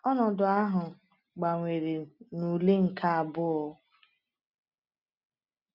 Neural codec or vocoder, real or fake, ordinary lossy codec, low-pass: none; real; none; 5.4 kHz